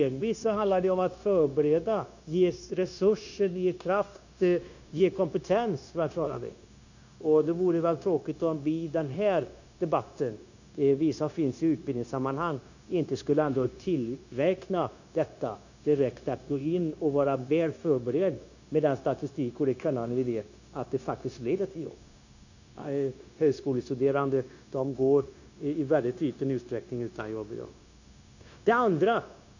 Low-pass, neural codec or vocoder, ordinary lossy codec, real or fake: 7.2 kHz; codec, 16 kHz, 0.9 kbps, LongCat-Audio-Codec; none; fake